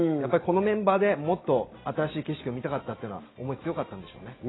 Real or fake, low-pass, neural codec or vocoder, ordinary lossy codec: real; 7.2 kHz; none; AAC, 16 kbps